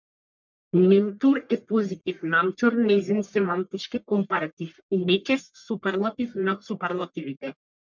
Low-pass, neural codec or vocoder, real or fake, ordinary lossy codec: 7.2 kHz; codec, 44.1 kHz, 1.7 kbps, Pupu-Codec; fake; MP3, 64 kbps